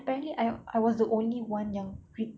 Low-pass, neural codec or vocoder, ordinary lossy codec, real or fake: none; none; none; real